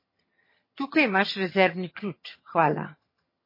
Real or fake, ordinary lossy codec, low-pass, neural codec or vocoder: fake; MP3, 24 kbps; 5.4 kHz; vocoder, 22.05 kHz, 80 mel bands, HiFi-GAN